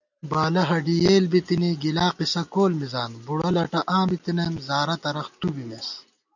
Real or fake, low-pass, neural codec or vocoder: real; 7.2 kHz; none